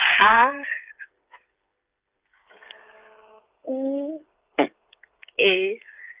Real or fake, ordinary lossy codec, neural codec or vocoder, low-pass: fake; Opus, 24 kbps; codec, 16 kHz, 8 kbps, FreqCodec, smaller model; 3.6 kHz